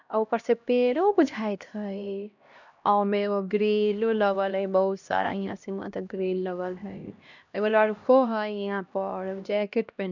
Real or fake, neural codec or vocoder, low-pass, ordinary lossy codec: fake; codec, 16 kHz, 1 kbps, X-Codec, HuBERT features, trained on LibriSpeech; 7.2 kHz; none